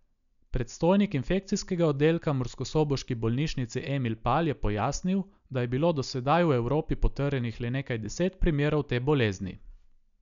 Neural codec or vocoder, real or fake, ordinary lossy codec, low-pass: none; real; none; 7.2 kHz